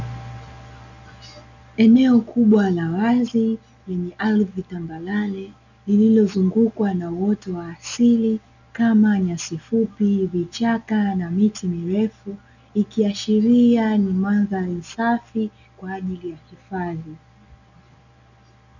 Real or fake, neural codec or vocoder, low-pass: real; none; 7.2 kHz